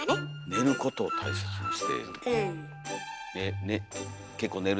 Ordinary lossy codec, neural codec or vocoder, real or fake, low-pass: none; none; real; none